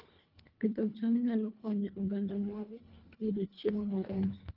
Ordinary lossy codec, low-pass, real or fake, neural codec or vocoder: Opus, 24 kbps; 5.4 kHz; fake; codec, 24 kHz, 1.5 kbps, HILCodec